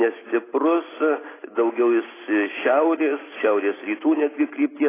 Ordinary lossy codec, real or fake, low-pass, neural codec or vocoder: AAC, 16 kbps; real; 3.6 kHz; none